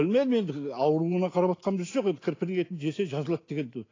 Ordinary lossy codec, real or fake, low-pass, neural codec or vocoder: AAC, 32 kbps; real; 7.2 kHz; none